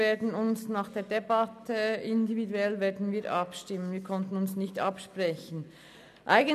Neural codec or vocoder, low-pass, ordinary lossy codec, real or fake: none; 14.4 kHz; none; real